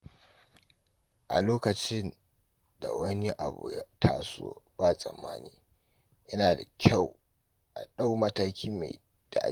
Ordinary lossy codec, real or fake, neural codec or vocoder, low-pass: Opus, 32 kbps; fake; vocoder, 44.1 kHz, 128 mel bands, Pupu-Vocoder; 19.8 kHz